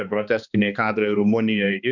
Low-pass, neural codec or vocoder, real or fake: 7.2 kHz; codec, 16 kHz, 2 kbps, X-Codec, HuBERT features, trained on balanced general audio; fake